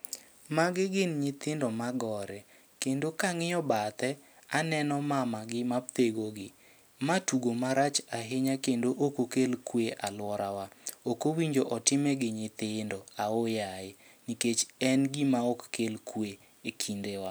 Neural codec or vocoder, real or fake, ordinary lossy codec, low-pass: none; real; none; none